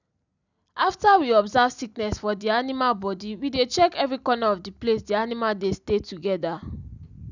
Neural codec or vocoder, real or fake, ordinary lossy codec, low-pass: none; real; none; 7.2 kHz